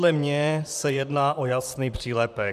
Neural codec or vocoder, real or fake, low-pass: vocoder, 44.1 kHz, 128 mel bands, Pupu-Vocoder; fake; 14.4 kHz